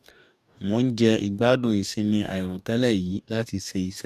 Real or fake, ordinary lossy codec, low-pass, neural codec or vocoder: fake; none; 14.4 kHz; codec, 44.1 kHz, 2.6 kbps, DAC